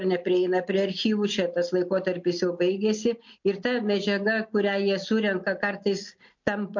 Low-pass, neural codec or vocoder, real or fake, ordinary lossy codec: 7.2 kHz; none; real; MP3, 48 kbps